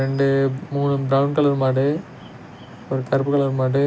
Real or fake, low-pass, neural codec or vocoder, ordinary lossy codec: real; none; none; none